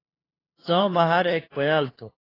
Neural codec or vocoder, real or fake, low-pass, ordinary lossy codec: codec, 16 kHz, 2 kbps, FunCodec, trained on LibriTTS, 25 frames a second; fake; 5.4 kHz; AAC, 24 kbps